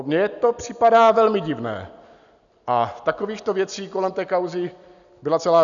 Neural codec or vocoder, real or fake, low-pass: none; real; 7.2 kHz